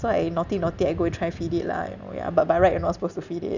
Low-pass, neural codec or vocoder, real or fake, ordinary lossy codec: 7.2 kHz; none; real; none